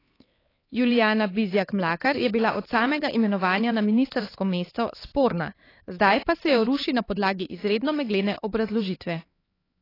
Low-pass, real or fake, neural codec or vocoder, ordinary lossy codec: 5.4 kHz; fake; codec, 16 kHz, 4 kbps, X-Codec, HuBERT features, trained on LibriSpeech; AAC, 24 kbps